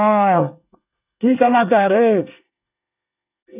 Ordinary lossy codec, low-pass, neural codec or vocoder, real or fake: none; 3.6 kHz; codec, 24 kHz, 1 kbps, SNAC; fake